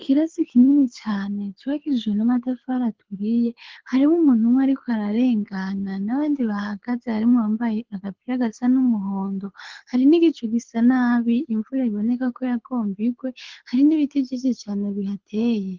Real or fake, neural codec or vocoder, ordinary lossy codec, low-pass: fake; codec, 24 kHz, 6 kbps, HILCodec; Opus, 16 kbps; 7.2 kHz